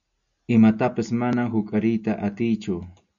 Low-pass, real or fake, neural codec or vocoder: 7.2 kHz; real; none